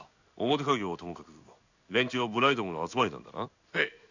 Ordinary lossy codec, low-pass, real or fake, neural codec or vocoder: none; 7.2 kHz; fake; codec, 16 kHz in and 24 kHz out, 1 kbps, XY-Tokenizer